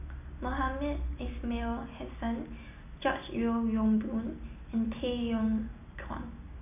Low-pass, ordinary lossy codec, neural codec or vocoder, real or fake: 3.6 kHz; none; none; real